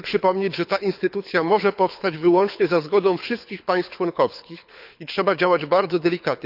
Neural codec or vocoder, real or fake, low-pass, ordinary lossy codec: codec, 24 kHz, 6 kbps, HILCodec; fake; 5.4 kHz; none